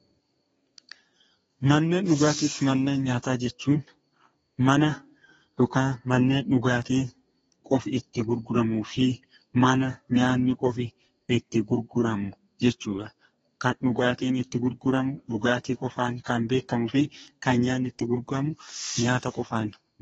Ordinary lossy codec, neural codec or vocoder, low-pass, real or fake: AAC, 24 kbps; codec, 32 kHz, 1.9 kbps, SNAC; 14.4 kHz; fake